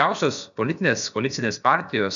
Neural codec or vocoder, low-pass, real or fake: codec, 16 kHz, about 1 kbps, DyCAST, with the encoder's durations; 7.2 kHz; fake